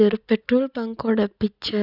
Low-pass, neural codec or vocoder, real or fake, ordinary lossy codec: 5.4 kHz; none; real; none